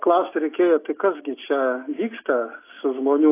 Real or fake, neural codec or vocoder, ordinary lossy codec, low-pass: real; none; AAC, 24 kbps; 3.6 kHz